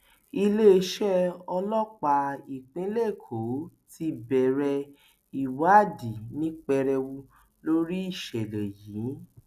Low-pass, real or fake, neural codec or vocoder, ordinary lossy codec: 14.4 kHz; real; none; none